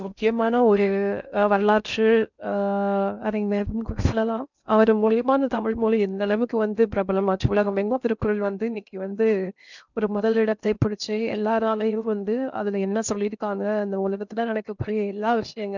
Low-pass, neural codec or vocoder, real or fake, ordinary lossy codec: 7.2 kHz; codec, 16 kHz in and 24 kHz out, 0.6 kbps, FocalCodec, streaming, 2048 codes; fake; none